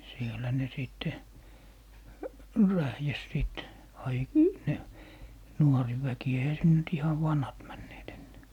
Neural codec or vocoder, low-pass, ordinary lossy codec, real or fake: none; 19.8 kHz; none; real